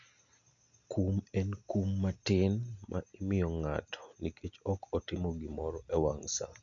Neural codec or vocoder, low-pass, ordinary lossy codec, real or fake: none; 7.2 kHz; none; real